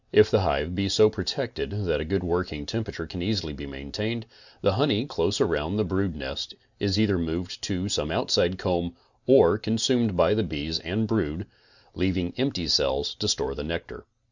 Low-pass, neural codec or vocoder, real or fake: 7.2 kHz; none; real